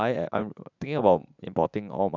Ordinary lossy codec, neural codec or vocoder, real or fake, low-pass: none; vocoder, 44.1 kHz, 80 mel bands, Vocos; fake; 7.2 kHz